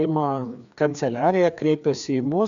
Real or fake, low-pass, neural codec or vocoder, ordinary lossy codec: fake; 7.2 kHz; codec, 16 kHz, 2 kbps, FreqCodec, larger model; MP3, 96 kbps